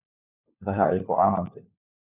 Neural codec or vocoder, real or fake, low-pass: codec, 16 kHz, 4 kbps, FunCodec, trained on LibriTTS, 50 frames a second; fake; 3.6 kHz